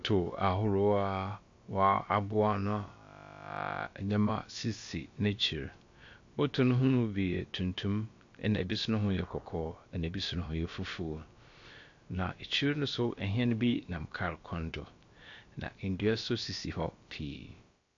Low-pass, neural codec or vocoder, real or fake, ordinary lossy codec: 7.2 kHz; codec, 16 kHz, about 1 kbps, DyCAST, with the encoder's durations; fake; AAC, 48 kbps